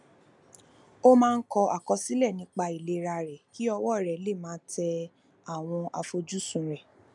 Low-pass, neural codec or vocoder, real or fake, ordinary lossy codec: 10.8 kHz; none; real; none